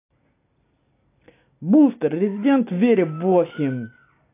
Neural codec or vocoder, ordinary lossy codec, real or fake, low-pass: none; AAC, 24 kbps; real; 3.6 kHz